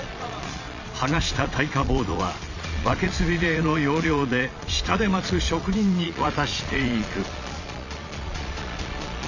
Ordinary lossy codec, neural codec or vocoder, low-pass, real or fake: none; vocoder, 22.05 kHz, 80 mel bands, Vocos; 7.2 kHz; fake